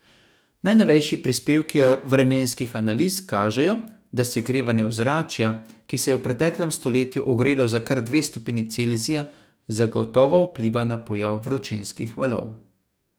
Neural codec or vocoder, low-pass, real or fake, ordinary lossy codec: codec, 44.1 kHz, 2.6 kbps, DAC; none; fake; none